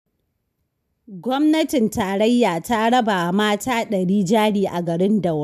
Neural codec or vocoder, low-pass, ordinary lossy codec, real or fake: none; 14.4 kHz; none; real